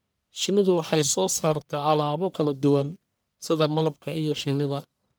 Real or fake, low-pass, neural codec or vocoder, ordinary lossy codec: fake; none; codec, 44.1 kHz, 1.7 kbps, Pupu-Codec; none